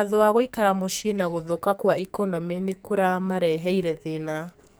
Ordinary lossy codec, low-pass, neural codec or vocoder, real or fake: none; none; codec, 44.1 kHz, 2.6 kbps, SNAC; fake